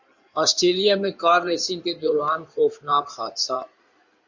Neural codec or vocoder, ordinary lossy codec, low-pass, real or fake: codec, 16 kHz in and 24 kHz out, 2.2 kbps, FireRedTTS-2 codec; Opus, 64 kbps; 7.2 kHz; fake